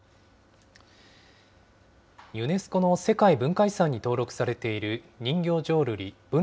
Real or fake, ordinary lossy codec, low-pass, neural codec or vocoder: real; none; none; none